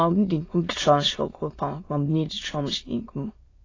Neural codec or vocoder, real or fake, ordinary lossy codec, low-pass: autoencoder, 22.05 kHz, a latent of 192 numbers a frame, VITS, trained on many speakers; fake; AAC, 32 kbps; 7.2 kHz